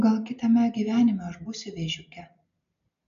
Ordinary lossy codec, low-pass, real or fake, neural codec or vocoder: AAC, 96 kbps; 7.2 kHz; real; none